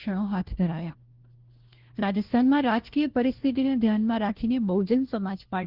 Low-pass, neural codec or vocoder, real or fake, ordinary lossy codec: 5.4 kHz; codec, 16 kHz, 1 kbps, FunCodec, trained on LibriTTS, 50 frames a second; fake; Opus, 16 kbps